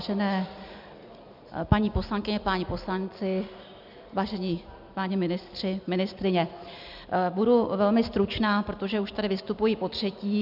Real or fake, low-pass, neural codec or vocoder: real; 5.4 kHz; none